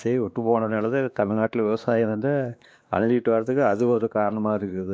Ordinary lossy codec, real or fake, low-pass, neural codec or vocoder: none; fake; none; codec, 16 kHz, 2 kbps, X-Codec, WavLM features, trained on Multilingual LibriSpeech